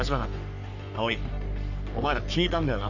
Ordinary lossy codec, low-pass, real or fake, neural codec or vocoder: none; 7.2 kHz; fake; codec, 44.1 kHz, 3.4 kbps, Pupu-Codec